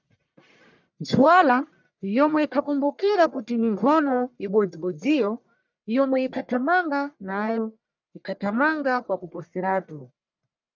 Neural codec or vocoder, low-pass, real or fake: codec, 44.1 kHz, 1.7 kbps, Pupu-Codec; 7.2 kHz; fake